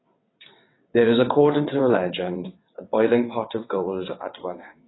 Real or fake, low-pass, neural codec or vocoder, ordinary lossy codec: fake; 7.2 kHz; vocoder, 22.05 kHz, 80 mel bands, WaveNeXt; AAC, 16 kbps